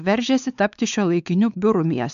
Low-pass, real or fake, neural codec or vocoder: 7.2 kHz; fake; codec, 16 kHz, 4 kbps, X-Codec, WavLM features, trained on Multilingual LibriSpeech